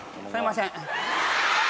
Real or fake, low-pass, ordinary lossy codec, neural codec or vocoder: real; none; none; none